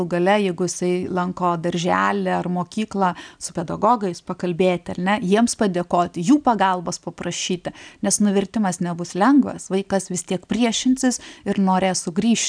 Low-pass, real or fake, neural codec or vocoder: 9.9 kHz; real; none